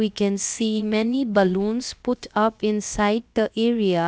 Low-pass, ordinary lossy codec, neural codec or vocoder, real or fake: none; none; codec, 16 kHz, about 1 kbps, DyCAST, with the encoder's durations; fake